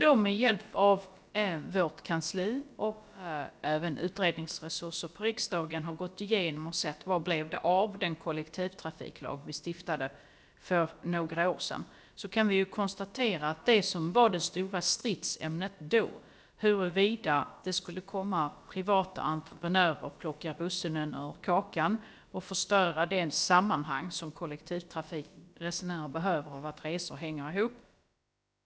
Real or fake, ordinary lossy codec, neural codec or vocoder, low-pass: fake; none; codec, 16 kHz, about 1 kbps, DyCAST, with the encoder's durations; none